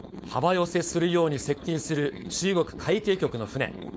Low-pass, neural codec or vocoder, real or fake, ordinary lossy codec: none; codec, 16 kHz, 4.8 kbps, FACodec; fake; none